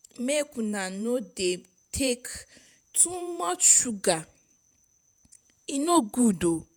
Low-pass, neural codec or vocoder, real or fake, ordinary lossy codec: none; vocoder, 48 kHz, 128 mel bands, Vocos; fake; none